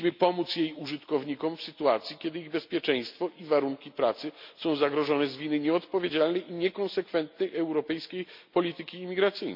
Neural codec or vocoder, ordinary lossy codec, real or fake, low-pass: none; MP3, 48 kbps; real; 5.4 kHz